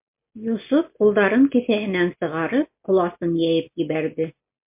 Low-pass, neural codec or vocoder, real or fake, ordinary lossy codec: 3.6 kHz; none; real; MP3, 32 kbps